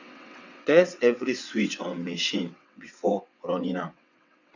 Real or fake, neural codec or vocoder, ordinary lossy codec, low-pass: fake; vocoder, 22.05 kHz, 80 mel bands, WaveNeXt; none; 7.2 kHz